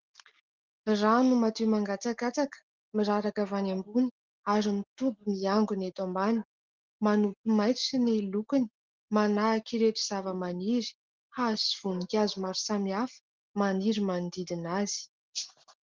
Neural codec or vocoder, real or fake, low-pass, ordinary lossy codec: none; real; 7.2 kHz; Opus, 16 kbps